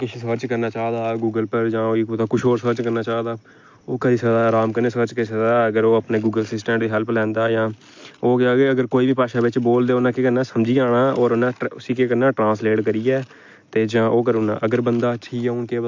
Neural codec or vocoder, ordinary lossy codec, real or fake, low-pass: none; MP3, 48 kbps; real; 7.2 kHz